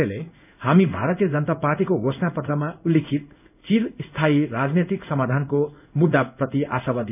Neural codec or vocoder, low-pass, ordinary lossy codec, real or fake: codec, 16 kHz in and 24 kHz out, 1 kbps, XY-Tokenizer; 3.6 kHz; none; fake